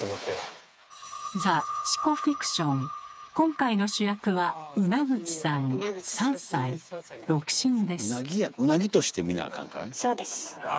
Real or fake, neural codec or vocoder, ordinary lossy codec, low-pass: fake; codec, 16 kHz, 4 kbps, FreqCodec, smaller model; none; none